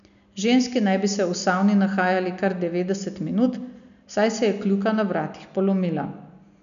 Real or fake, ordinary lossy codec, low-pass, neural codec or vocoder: real; none; 7.2 kHz; none